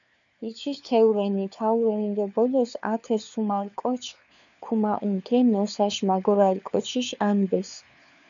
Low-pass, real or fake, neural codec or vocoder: 7.2 kHz; fake; codec, 16 kHz, 4 kbps, FunCodec, trained on LibriTTS, 50 frames a second